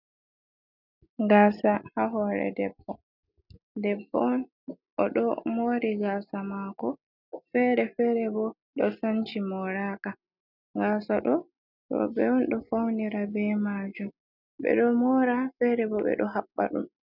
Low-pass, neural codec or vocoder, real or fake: 5.4 kHz; none; real